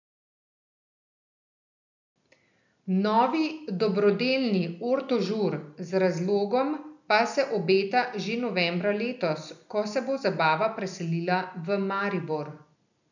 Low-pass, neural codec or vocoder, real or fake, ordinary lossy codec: 7.2 kHz; none; real; none